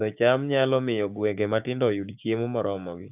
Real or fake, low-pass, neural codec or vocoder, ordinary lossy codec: fake; 3.6 kHz; codec, 16 kHz, 6 kbps, DAC; AAC, 32 kbps